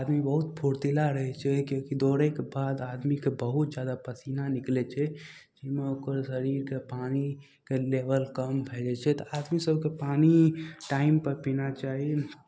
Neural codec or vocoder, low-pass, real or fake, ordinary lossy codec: none; none; real; none